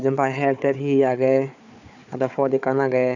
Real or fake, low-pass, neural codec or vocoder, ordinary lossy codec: fake; 7.2 kHz; codec, 16 kHz, 4 kbps, FunCodec, trained on Chinese and English, 50 frames a second; none